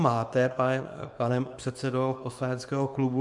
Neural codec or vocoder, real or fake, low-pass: codec, 24 kHz, 0.9 kbps, WavTokenizer, small release; fake; 10.8 kHz